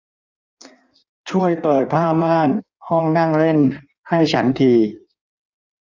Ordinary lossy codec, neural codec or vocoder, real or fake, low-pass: none; codec, 16 kHz in and 24 kHz out, 1.1 kbps, FireRedTTS-2 codec; fake; 7.2 kHz